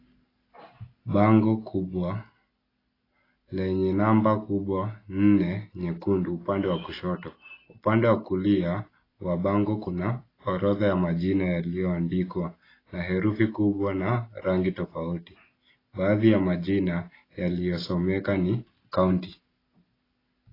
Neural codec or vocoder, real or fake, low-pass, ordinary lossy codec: none; real; 5.4 kHz; AAC, 24 kbps